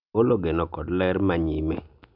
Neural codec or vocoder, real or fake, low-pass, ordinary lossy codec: none; real; 5.4 kHz; none